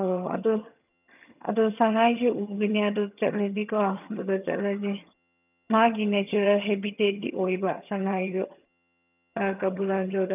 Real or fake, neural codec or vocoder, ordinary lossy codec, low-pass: fake; vocoder, 22.05 kHz, 80 mel bands, HiFi-GAN; none; 3.6 kHz